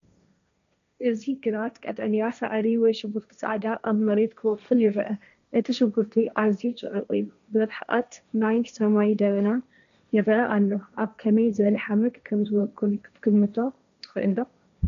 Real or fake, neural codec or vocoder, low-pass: fake; codec, 16 kHz, 1.1 kbps, Voila-Tokenizer; 7.2 kHz